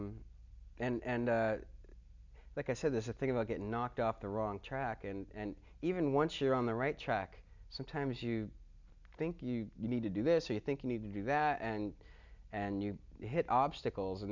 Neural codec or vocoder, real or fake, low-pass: none; real; 7.2 kHz